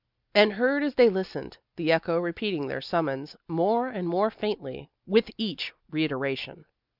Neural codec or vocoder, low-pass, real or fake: none; 5.4 kHz; real